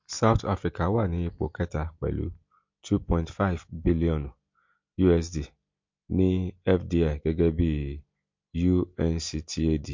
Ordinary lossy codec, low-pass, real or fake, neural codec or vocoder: MP3, 48 kbps; 7.2 kHz; real; none